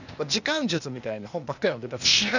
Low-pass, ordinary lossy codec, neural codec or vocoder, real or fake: 7.2 kHz; none; codec, 16 kHz, 0.8 kbps, ZipCodec; fake